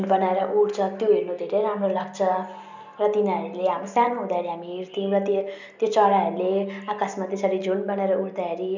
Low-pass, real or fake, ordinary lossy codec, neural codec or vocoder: 7.2 kHz; real; none; none